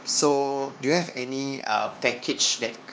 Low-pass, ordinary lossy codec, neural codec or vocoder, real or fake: none; none; codec, 16 kHz, 2 kbps, X-Codec, HuBERT features, trained on LibriSpeech; fake